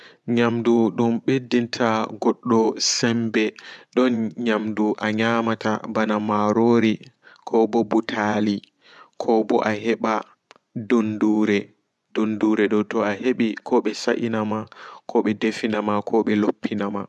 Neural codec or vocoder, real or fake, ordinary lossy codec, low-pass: vocoder, 24 kHz, 100 mel bands, Vocos; fake; none; none